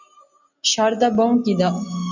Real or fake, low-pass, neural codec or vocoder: real; 7.2 kHz; none